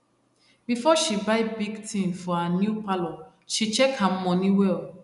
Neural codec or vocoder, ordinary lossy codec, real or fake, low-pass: none; none; real; 10.8 kHz